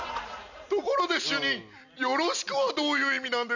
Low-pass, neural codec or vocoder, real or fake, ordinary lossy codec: 7.2 kHz; none; real; none